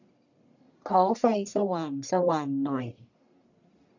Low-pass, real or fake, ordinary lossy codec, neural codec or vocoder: 7.2 kHz; fake; none; codec, 44.1 kHz, 1.7 kbps, Pupu-Codec